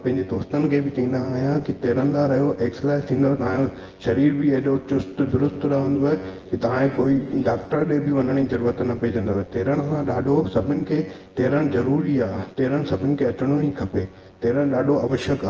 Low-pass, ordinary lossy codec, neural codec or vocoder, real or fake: 7.2 kHz; Opus, 16 kbps; vocoder, 24 kHz, 100 mel bands, Vocos; fake